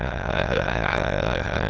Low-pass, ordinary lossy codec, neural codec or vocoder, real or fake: 7.2 kHz; Opus, 16 kbps; autoencoder, 22.05 kHz, a latent of 192 numbers a frame, VITS, trained on many speakers; fake